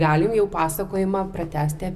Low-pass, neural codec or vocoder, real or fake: 14.4 kHz; none; real